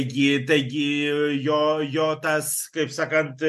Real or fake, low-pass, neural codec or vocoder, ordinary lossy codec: real; 14.4 kHz; none; MP3, 64 kbps